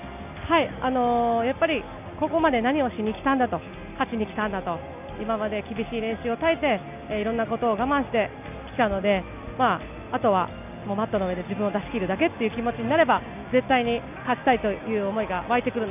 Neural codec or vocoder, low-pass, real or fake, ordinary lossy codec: none; 3.6 kHz; real; none